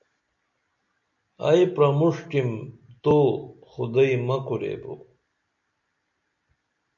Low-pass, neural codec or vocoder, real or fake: 7.2 kHz; none; real